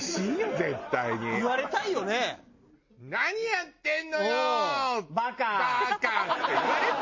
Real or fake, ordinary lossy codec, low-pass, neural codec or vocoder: real; MP3, 32 kbps; 7.2 kHz; none